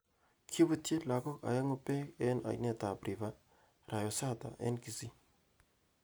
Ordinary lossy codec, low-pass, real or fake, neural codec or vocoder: none; none; real; none